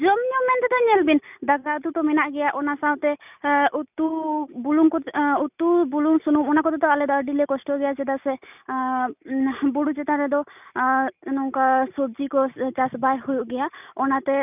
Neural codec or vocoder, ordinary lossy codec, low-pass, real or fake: none; none; 3.6 kHz; real